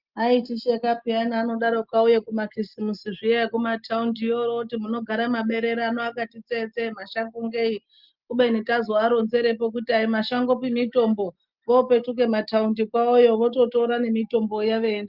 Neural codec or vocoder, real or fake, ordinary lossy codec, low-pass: none; real; Opus, 24 kbps; 5.4 kHz